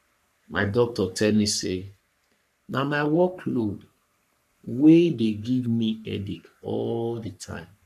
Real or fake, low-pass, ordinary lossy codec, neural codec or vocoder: fake; 14.4 kHz; none; codec, 44.1 kHz, 3.4 kbps, Pupu-Codec